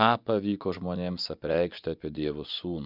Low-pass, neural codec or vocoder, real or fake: 5.4 kHz; vocoder, 24 kHz, 100 mel bands, Vocos; fake